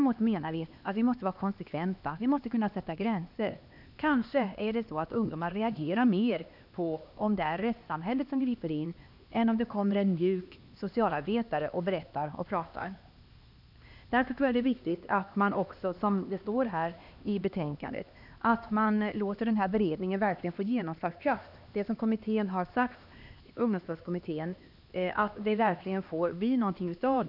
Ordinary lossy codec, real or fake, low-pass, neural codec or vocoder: none; fake; 5.4 kHz; codec, 16 kHz, 2 kbps, X-Codec, HuBERT features, trained on LibriSpeech